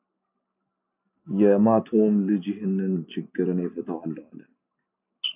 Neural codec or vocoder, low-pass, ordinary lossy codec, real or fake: none; 3.6 kHz; AAC, 32 kbps; real